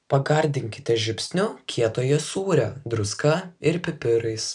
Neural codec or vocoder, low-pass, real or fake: none; 10.8 kHz; real